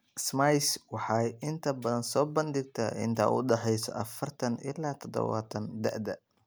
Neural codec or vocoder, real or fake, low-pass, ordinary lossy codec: none; real; none; none